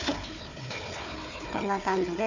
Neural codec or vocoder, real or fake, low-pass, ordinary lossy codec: codec, 16 kHz, 4 kbps, FunCodec, trained on Chinese and English, 50 frames a second; fake; 7.2 kHz; none